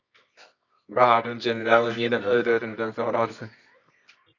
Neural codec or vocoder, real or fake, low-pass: codec, 24 kHz, 0.9 kbps, WavTokenizer, medium music audio release; fake; 7.2 kHz